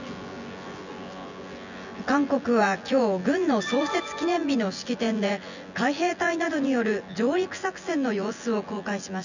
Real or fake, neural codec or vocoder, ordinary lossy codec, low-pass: fake; vocoder, 24 kHz, 100 mel bands, Vocos; none; 7.2 kHz